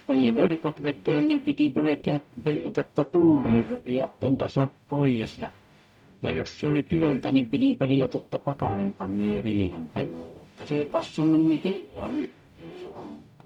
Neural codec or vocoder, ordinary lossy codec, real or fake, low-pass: codec, 44.1 kHz, 0.9 kbps, DAC; none; fake; 19.8 kHz